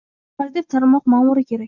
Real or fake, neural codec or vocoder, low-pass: real; none; 7.2 kHz